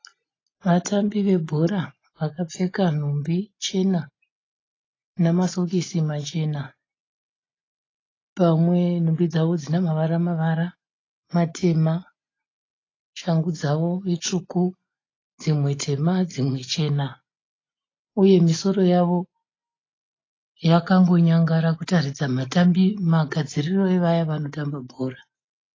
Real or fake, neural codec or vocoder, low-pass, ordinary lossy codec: real; none; 7.2 kHz; AAC, 32 kbps